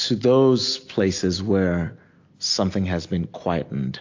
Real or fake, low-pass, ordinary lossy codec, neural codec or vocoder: real; 7.2 kHz; AAC, 48 kbps; none